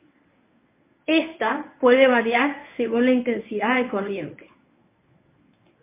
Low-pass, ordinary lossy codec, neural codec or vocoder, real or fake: 3.6 kHz; MP3, 24 kbps; codec, 24 kHz, 0.9 kbps, WavTokenizer, medium speech release version 1; fake